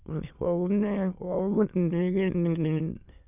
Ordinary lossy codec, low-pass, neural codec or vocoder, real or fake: none; 3.6 kHz; autoencoder, 22.05 kHz, a latent of 192 numbers a frame, VITS, trained on many speakers; fake